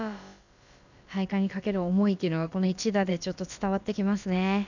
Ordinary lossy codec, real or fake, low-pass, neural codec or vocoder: none; fake; 7.2 kHz; codec, 16 kHz, about 1 kbps, DyCAST, with the encoder's durations